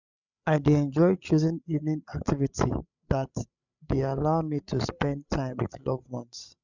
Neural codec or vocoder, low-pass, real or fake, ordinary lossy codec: codec, 16 kHz, 8 kbps, FreqCodec, larger model; 7.2 kHz; fake; none